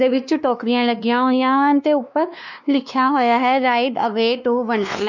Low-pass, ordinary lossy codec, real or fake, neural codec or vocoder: 7.2 kHz; none; fake; codec, 16 kHz, 2 kbps, X-Codec, WavLM features, trained on Multilingual LibriSpeech